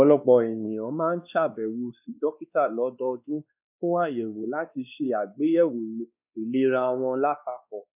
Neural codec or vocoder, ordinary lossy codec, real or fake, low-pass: codec, 16 kHz, 2 kbps, X-Codec, WavLM features, trained on Multilingual LibriSpeech; none; fake; 3.6 kHz